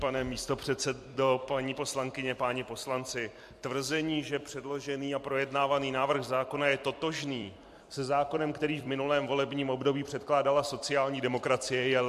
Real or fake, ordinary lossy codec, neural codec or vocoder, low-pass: fake; MP3, 64 kbps; vocoder, 44.1 kHz, 128 mel bands every 256 samples, BigVGAN v2; 14.4 kHz